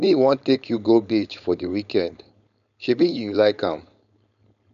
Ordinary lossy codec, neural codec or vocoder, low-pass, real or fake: none; codec, 16 kHz, 4.8 kbps, FACodec; 7.2 kHz; fake